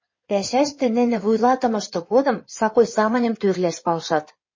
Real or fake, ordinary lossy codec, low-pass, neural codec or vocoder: fake; MP3, 32 kbps; 7.2 kHz; codec, 16 kHz in and 24 kHz out, 2.2 kbps, FireRedTTS-2 codec